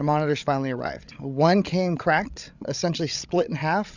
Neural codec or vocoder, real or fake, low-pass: codec, 16 kHz, 16 kbps, FreqCodec, larger model; fake; 7.2 kHz